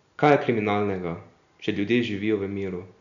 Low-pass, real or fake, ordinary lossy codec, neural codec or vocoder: 7.2 kHz; real; none; none